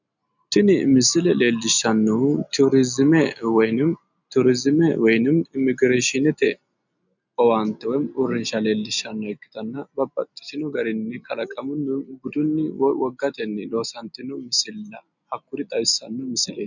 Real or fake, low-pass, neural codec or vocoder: real; 7.2 kHz; none